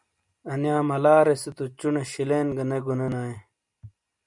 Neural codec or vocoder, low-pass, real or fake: none; 10.8 kHz; real